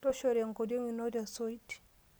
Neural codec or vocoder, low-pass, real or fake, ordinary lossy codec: none; none; real; none